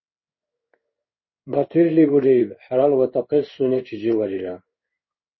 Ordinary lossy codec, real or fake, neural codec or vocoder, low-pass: MP3, 24 kbps; fake; codec, 16 kHz in and 24 kHz out, 1 kbps, XY-Tokenizer; 7.2 kHz